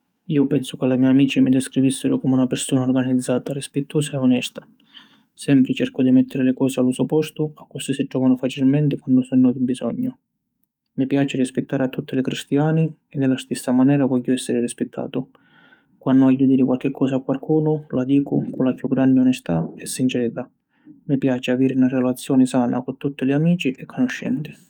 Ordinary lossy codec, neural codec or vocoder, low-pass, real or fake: none; codec, 44.1 kHz, 7.8 kbps, DAC; 19.8 kHz; fake